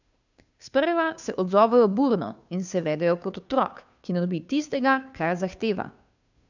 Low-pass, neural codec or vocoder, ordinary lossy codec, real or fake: 7.2 kHz; codec, 16 kHz, 2 kbps, FunCodec, trained on Chinese and English, 25 frames a second; none; fake